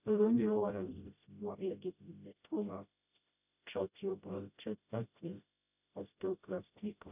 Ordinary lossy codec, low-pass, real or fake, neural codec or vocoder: none; 3.6 kHz; fake; codec, 16 kHz, 0.5 kbps, FreqCodec, smaller model